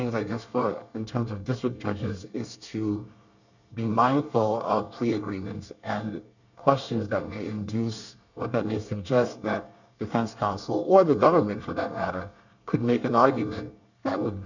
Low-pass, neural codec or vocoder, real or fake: 7.2 kHz; codec, 24 kHz, 1 kbps, SNAC; fake